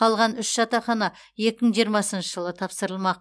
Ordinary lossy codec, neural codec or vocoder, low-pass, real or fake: none; none; none; real